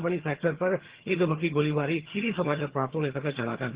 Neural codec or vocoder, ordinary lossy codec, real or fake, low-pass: vocoder, 22.05 kHz, 80 mel bands, HiFi-GAN; Opus, 16 kbps; fake; 3.6 kHz